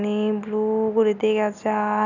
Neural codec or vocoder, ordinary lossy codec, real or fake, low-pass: none; none; real; 7.2 kHz